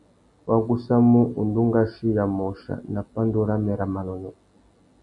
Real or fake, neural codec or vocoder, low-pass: real; none; 10.8 kHz